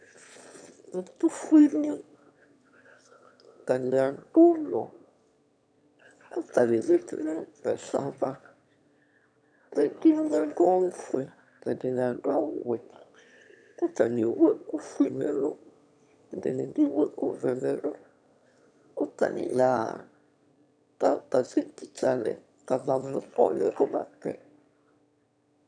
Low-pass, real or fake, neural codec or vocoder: 9.9 kHz; fake; autoencoder, 22.05 kHz, a latent of 192 numbers a frame, VITS, trained on one speaker